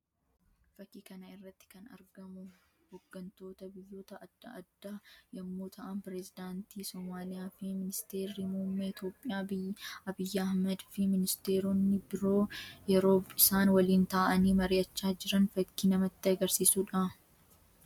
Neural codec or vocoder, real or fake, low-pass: none; real; 19.8 kHz